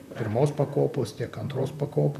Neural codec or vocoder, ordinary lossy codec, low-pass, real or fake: vocoder, 44.1 kHz, 128 mel bands, Pupu-Vocoder; AAC, 96 kbps; 14.4 kHz; fake